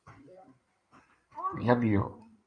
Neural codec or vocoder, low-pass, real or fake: codec, 16 kHz in and 24 kHz out, 2.2 kbps, FireRedTTS-2 codec; 9.9 kHz; fake